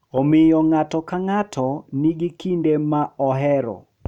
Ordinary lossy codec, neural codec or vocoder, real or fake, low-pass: none; none; real; 19.8 kHz